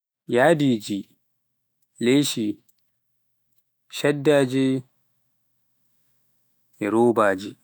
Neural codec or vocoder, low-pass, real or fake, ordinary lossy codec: autoencoder, 48 kHz, 128 numbers a frame, DAC-VAE, trained on Japanese speech; none; fake; none